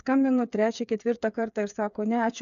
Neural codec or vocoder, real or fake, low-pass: codec, 16 kHz, 8 kbps, FreqCodec, smaller model; fake; 7.2 kHz